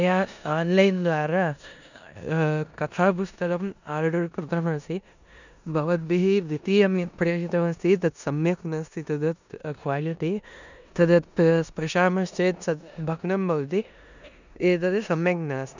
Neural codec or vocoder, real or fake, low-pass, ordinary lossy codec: codec, 16 kHz in and 24 kHz out, 0.9 kbps, LongCat-Audio-Codec, four codebook decoder; fake; 7.2 kHz; none